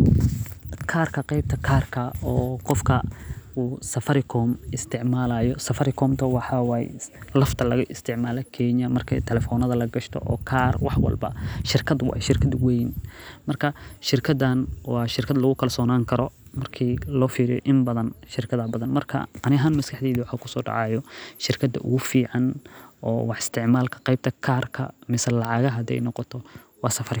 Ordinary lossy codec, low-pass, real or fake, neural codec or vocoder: none; none; real; none